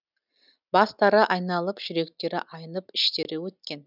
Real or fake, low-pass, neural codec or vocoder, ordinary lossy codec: real; 5.4 kHz; none; none